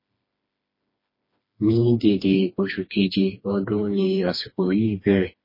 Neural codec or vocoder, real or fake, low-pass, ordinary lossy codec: codec, 16 kHz, 2 kbps, FreqCodec, smaller model; fake; 5.4 kHz; MP3, 24 kbps